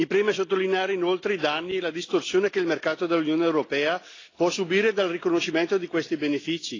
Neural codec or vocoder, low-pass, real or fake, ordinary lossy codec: none; 7.2 kHz; real; AAC, 32 kbps